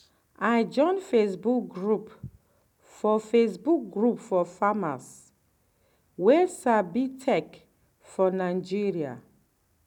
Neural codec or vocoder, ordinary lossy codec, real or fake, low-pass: none; none; real; 19.8 kHz